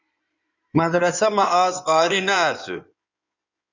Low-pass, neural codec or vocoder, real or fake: 7.2 kHz; codec, 16 kHz in and 24 kHz out, 2.2 kbps, FireRedTTS-2 codec; fake